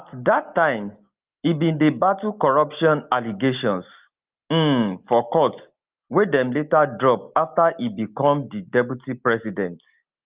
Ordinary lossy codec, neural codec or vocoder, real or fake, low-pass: Opus, 32 kbps; none; real; 3.6 kHz